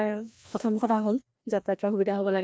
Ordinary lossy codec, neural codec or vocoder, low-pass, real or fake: none; codec, 16 kHz, 1 kbps, FreqCodec, larger model; none; fake